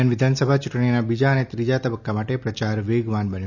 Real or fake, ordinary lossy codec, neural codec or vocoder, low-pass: real; none; none; 7.2 kHz